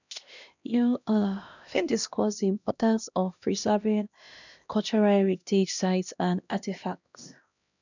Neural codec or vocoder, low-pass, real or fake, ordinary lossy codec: codec, 16 kHz, 1 kbps, X-Codec, HuBERT features, trained on LibriSpeech; 7.2 kHz; fake; none